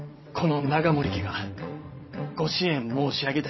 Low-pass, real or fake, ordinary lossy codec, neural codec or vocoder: 7.2 kHz; fake; MP3, 24 kbps; vocoder, 22.05 kHz, 80 mel bands, WaveNeXt